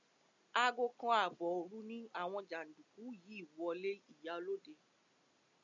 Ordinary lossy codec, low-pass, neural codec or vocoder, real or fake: MP3, 96 kbps; 7.2 kHz; none; real